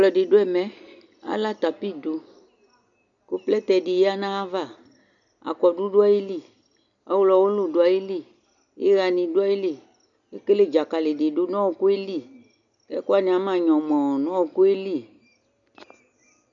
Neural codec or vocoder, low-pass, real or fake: none; 7.2 kHz; real